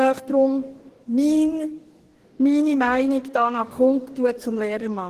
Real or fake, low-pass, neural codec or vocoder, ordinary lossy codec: fake; 14.4 kHz; codec, 44.1 kHz, 2.6 kbps, DAC; Opus, 16 kbps